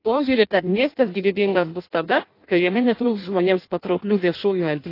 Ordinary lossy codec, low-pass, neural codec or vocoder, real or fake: AAC, 32 kbps; 5.4 kHz; codec, 16 kHz in and 24 kHz out, 0.6 kbps, FireRedTTS-2 codec; fake